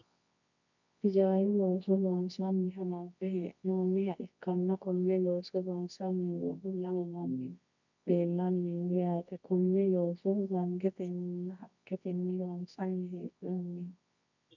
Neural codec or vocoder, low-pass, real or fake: codec, 24 kHz, 0.9 kbps, WavTokenizer, medium music audio release; 7.2 kHz; fake